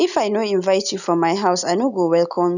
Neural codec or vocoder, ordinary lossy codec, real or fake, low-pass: none; none; real; 7.2 kHz